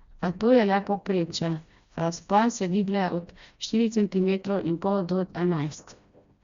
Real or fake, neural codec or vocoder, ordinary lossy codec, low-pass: fake; codec, 16 kHz, 1 kbps, FreqCodec, smaller model; Opus, 64 kbps; 7.2 kHz